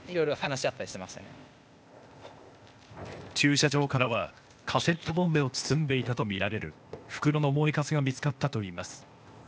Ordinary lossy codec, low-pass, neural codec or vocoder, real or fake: none; none; codec, 16 kHz, 0.8 kbps, ZipCodec; fake